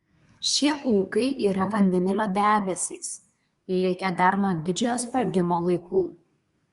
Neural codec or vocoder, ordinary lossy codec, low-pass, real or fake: codec, 24 kHz, 1 kbps, SNAC; Opus, 64 kbps; 10.8 kHz; fake